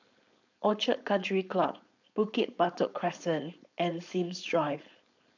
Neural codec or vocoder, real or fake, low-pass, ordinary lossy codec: codec, 16 kHz, 4.8 kbps, FACodec; fake; 7.2 kHz; none